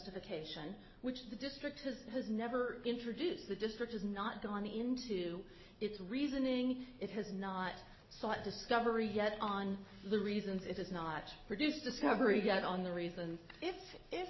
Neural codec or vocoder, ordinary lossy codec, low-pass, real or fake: none; MP3, 24 kbps; 7.2 kHz; real